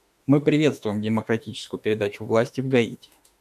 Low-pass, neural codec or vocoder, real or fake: 14.4 kHz; autoencoder, 48 kHz, 32 numbers a frame, DAC-VAE, trained on Japanese speech; fake